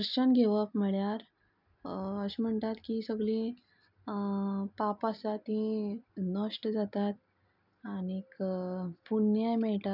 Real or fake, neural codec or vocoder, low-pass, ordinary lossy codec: real; none; 5.4 kHz; none